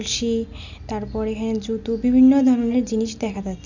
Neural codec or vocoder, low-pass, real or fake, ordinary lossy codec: none; 7.2 kHz; real; none